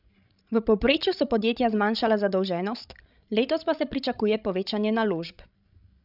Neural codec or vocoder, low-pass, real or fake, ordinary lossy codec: codec, 16 kHz, 16 kbps, FreqCodec, larger model; 5.4 kHz; fake; none